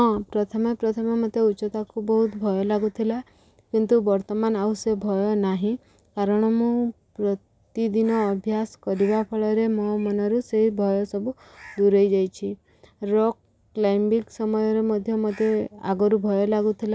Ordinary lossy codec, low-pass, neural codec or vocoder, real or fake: none; none; none; real